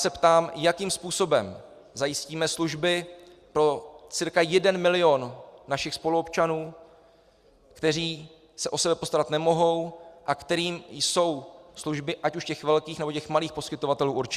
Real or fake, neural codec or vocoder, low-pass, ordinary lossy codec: real; none; 14.4 kHz; Opus, 64 kbps